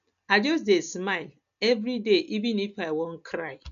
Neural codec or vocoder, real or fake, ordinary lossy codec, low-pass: none; real; none; 7.2 kHz